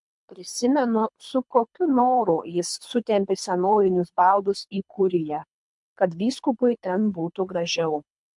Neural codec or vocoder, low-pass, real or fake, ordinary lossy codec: codec, 24 kHz, 3 kbps, HILCodec; 10.8 kHz; fake; MP3, 64 kbps